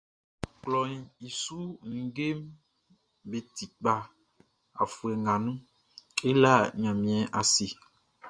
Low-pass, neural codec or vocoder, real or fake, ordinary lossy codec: 9.9 kHz; none; real; Opus, 64 kbps